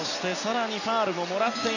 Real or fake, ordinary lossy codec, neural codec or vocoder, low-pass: real; none; none; 7.2 kHz